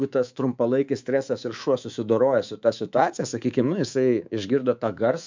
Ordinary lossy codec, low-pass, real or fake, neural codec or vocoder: MP3, 64 kbps; 7.2 kHz; fake; codec, 24 kHz, 3.1 kbps, DualCodec